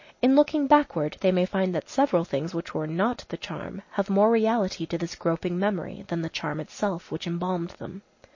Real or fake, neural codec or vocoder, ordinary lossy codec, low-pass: real; none; MP3, 32 kbps; 7.2 kHz